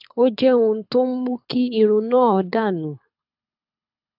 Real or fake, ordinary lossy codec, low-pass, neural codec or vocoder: fake; AAC, 48 kbps; 5.4 kHz; codec, 24 kHz, 6 kbps, HILCodec